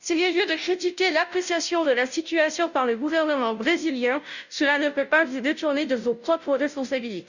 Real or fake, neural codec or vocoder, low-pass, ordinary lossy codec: fake; codec, 16 kHz, 0.5 kbps, FunCodec, trained on Chinese and English, 25 frames a second; 7.2 kHz; none